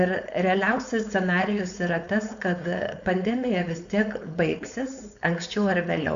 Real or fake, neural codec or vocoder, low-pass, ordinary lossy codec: fake; codec, 16 kHz, 4.8 kbps, FACodec; 7.2 kHz; AAC, 96 kbps